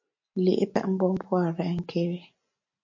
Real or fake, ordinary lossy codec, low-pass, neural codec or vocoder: real; MP3, 48 kbps; 7.2 kHz; none